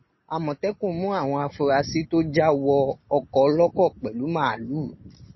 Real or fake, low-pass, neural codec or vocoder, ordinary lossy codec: real; 7.2 kHz; none; MP3, 24 kbps